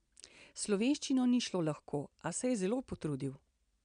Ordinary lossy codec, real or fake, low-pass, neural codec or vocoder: AAC, 96 kbps; real; 9.9 kHz; none